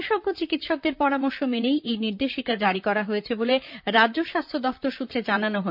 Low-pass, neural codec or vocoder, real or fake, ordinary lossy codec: 5.4 kHz; vocoder, 44.1 kHz, 128 mel bands, Pupu-Vocoder; fake; MP3, 48 kbps